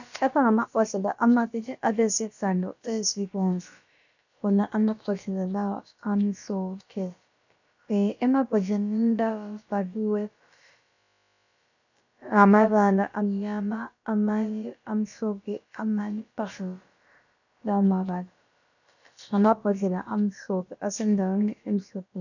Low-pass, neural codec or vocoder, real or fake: 7.2 kHz; codec, 16 kHz, about 1 kbps, DyCAST, with the encoder's durations; fake